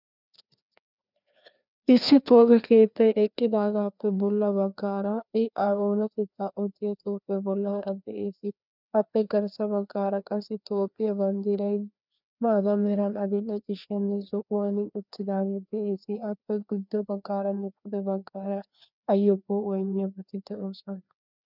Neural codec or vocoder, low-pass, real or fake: codec, 16 kHz, 2 kbps, FreqCodec, larger model; 5.4 kHz; fake